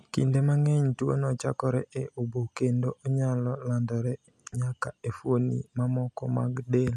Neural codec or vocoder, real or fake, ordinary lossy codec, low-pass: none; real; none; none